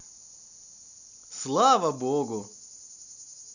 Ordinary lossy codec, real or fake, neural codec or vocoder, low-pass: none; real; none; 7.2 kHz